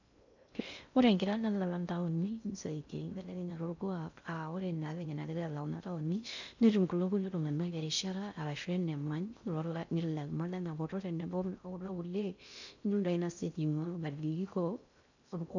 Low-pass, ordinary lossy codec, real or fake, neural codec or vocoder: 7.2 kHz; none; fake; codec, 16 kHz in and 24 kHz out, 0.6 kbps, FocalCodec, streaming, 2048 codes